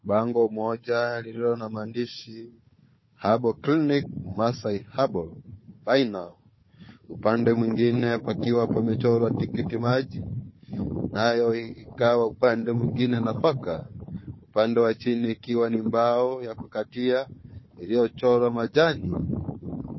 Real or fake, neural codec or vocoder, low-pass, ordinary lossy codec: fake; codec, 16 kHz, 4 kbps, FunCodec, trained on Chinese and English, 50 frames a second; 7.2 kHz; MP3, 24 kbps